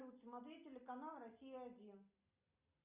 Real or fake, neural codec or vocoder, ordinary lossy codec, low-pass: real; none; Opus, 64 kbps; 3.6 kHz